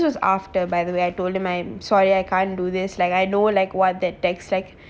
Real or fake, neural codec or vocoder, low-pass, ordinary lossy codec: real; none; none; none